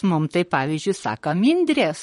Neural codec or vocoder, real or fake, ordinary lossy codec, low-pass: vocoder, 44.1 kHz, 128 mel bands, Pupu-Vocoder; fake; MP3, 48 kbps; 19.8 kHz